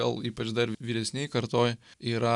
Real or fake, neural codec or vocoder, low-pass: real; none; 10.8 kHz